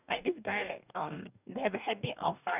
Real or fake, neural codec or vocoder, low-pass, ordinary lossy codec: fake; codec, 44.1 kHz, 2.6 kbps, DAC; 3.6 kHz; none